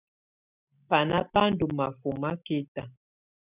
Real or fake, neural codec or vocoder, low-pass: real; none; 3.6 kHz